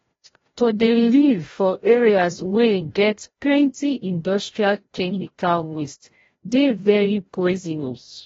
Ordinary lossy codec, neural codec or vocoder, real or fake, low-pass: AAC, 24 kbps; codec, 16 kHz, 0.5 kbps, FreqCodec, larger model; fake; 7.2 kHz